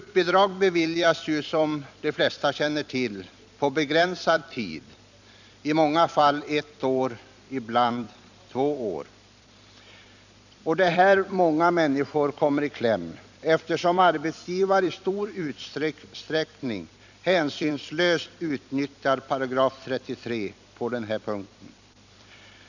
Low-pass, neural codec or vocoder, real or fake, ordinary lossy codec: 7.2 kHz; vocoder, 44.1 kHz, 128 mel bands every 256 samples, BigVGAN v2; fake; none